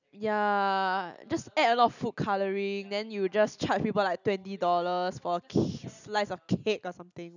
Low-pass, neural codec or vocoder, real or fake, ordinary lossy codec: 7.2 kHz; none; real; none